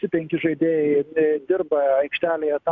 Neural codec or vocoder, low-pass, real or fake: none; 7.2 kHz; real